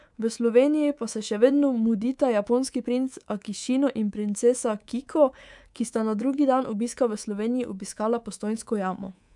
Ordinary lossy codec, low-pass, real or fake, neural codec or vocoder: none; 10.8 kHz; fake; codec, 24 kHz, 3.1 kbps, DualCodec